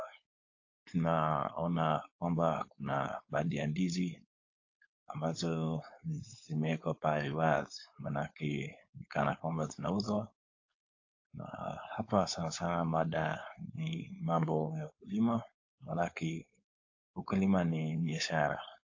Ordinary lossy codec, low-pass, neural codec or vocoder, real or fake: AAC, 48 kbps; 7.2 kHz; codec, 16 kHz, 4.8 kbps, FACodec; fake